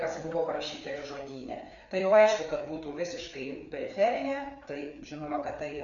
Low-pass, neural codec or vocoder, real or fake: 7.2 kHz; codec, 16 kHz, 4 kbps, FreqCodec, larger model; fake